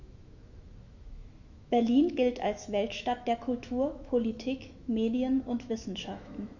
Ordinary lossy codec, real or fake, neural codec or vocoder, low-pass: none; fake; autoencoder, 48 kHz, 128 numbers a frame, DAC-VAE, trained on Japanese speech; 7.2 kHz